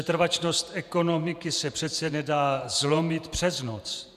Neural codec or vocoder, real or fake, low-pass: vocoder, 48 kHz, 128 mel bands, Vocos; fake; 14.4 kHz